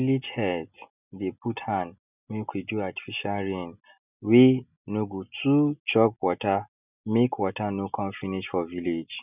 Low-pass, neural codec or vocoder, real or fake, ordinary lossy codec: 3.6 kHz; none; real; none